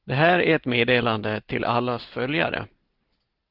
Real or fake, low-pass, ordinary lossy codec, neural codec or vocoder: real; 5.4 kHz; Opus, 16 kbps; none